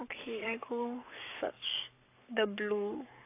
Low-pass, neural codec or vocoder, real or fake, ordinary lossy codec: 3.6 kHz; codec, 44.1 kHz, 7.8 kbps, Pupu-Codec; fake; AAC, 24 kbps